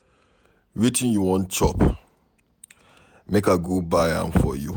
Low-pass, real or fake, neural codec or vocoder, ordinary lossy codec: none; fake; vocoder, 48 kHz, 128 mel bands, Vocos; none